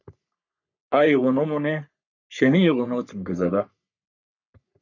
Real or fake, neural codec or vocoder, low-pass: fake; codec, 44.1 kHz, 3.4 kbps, Pupu-Codec; 7.2 kHz